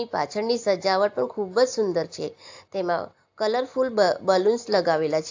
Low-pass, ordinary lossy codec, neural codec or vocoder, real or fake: 7.2 kHz; AAC, 48 kbps; none; real